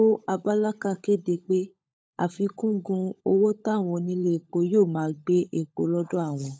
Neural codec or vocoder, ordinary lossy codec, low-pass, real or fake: codec, 16 kHz, 16 kbps, FunCodec, trained on LibriTTS, 50 frames a second; none; none; fake